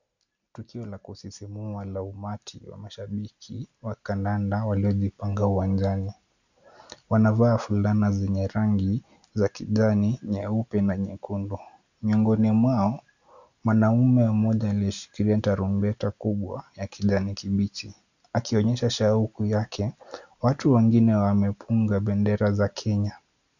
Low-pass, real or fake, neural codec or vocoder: 7.2 kHz; real; none